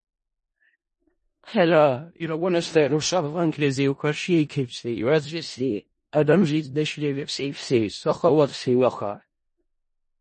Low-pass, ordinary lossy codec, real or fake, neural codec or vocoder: 10.8 kHz; MP3, 32 kbps; fake; codec, 16 kHz in and 24 kHz out, 0.4 kbps, LongCat-Audio-Codec, four codebook decoder